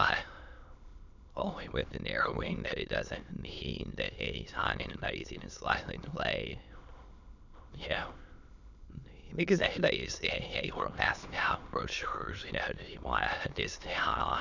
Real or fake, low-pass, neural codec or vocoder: fake; 7.2 kHz; autoencoder, 22.05 kHz, a latent of 192 numbers a frame, VITS, trained on many speakers